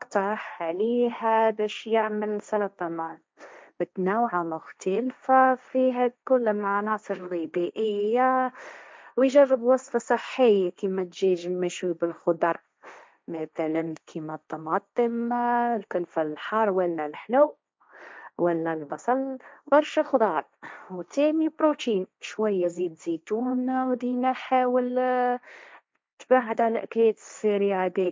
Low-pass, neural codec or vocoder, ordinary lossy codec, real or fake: none; codec, 16 kHz, 1.1 kbps, Voila-Tokenizer; none; fake